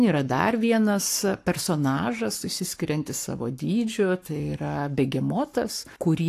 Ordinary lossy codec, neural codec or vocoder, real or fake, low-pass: AAC, 64 kbps; codec, 44.1 kHz, 7.8 kbps, Pupu-Codec; fake; 14.4 kHz